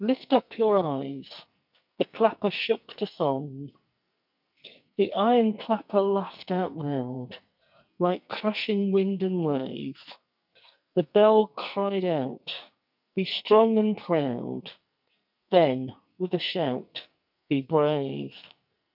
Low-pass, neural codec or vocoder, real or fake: 5.4 kHz; codec, 44.1 kHz, 2.6 kbps, SNAC; fake